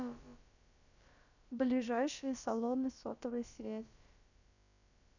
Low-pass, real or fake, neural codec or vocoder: 7.2 kHz; fake; codec, 16 kHz, about 1 kbps, DyCAST, with the encoder's durations